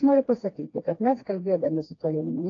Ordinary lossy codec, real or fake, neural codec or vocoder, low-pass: AAC, 32 kbps; fake; codec, 16 kHz, 2 kbps, FreqCodec, smaller model; 7.2 kHz